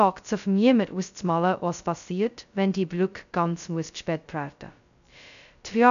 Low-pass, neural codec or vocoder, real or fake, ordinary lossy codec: 7.2 kHz; codec, 16 kHz, 0.2 kbps, FocalCodec; fake; none